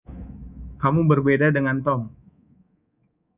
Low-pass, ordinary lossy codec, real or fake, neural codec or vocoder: 3.6 kHz; Opus, 64 kbps; fake; codec, 24 kHz, 3.1 kbps, DualCodec